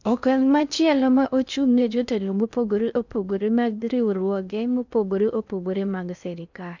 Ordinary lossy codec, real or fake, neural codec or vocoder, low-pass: none; fake; codec, 16 kHz in and 24 kHz out, 0.6 kbps, FocalCodec, streaming, 2048 codes; 7.2 kHz